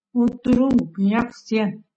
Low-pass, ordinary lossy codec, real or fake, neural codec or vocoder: 9.9 kHz; MP3, 32 kbps; real; none